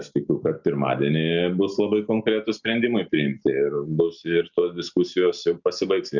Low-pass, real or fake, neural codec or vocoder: 7.2 kHz; real; none